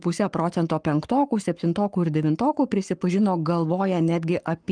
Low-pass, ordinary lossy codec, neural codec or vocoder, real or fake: 9.9 kHz; Opus, 32 kbps; codec, 44.1 kHz, 7.8 kbps, Pupu-Codec; fake